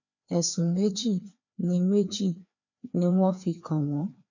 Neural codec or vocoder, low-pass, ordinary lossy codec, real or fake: codec, 16 kHz, 2 kbps, FreqCodec, larger model; 7.2 kHz; none; fake